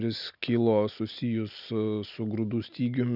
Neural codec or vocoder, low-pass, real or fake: none; 5.4 kHz; real